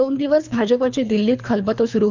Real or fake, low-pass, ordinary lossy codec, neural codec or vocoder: fake; 7.2 kHz; none; codec, 24 kHz, 3 kbps, HILCodec